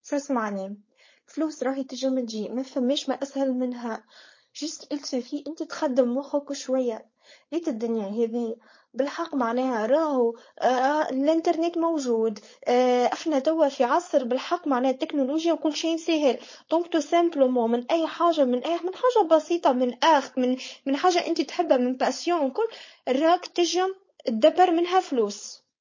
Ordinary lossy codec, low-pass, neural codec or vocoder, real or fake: MP3, 32 kbps; 7.2 kHz; codec, 16 kHz, 4.8 kbps, FACodec; fake